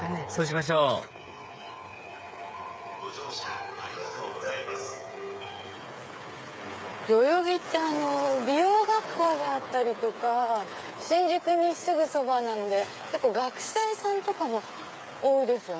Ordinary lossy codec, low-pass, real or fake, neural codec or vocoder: none; none; fake; codec, 16 kHz, 8 kbps, FreqCodec, smaller model